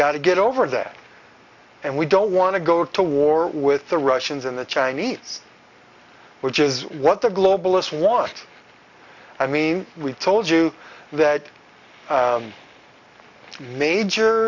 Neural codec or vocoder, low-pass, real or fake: none; 7.2 kHz; real